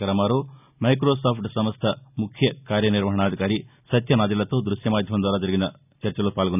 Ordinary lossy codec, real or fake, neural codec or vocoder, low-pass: none; real; none; 3.6 kHz